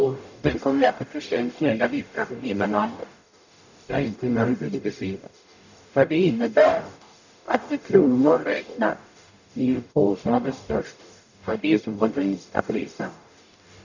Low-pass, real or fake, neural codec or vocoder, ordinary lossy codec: 7.2 kHz; fake; codec, 44.1 kHz, 0.9 kbps, DAC; none